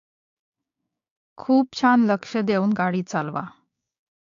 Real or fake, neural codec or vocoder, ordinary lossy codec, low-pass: fake; codec, 16 kHz, 6 kbps, DAC; AAC, 48 kbps; 7.2 kHz